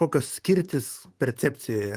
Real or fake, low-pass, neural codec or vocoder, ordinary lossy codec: fake; 14.4 kHz; vocoder, 44.1 kHz, 128 mel bands, Pupu-Vocoder; Opus, 24 kbps